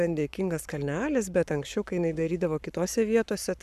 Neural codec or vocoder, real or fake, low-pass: codec, 44.1 kHz, 7.8 kbps, Pupu-Codec; fake; 14.4 kHz